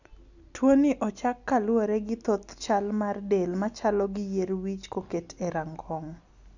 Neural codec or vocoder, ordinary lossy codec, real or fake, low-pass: none; none; real; 7.2 kHz